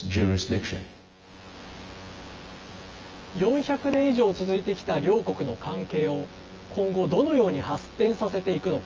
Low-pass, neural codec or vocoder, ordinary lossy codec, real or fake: 7.2 kHz; vocoder, 24 kHz, 100 mel bands, Vocos; Opus, 24 kbps; fake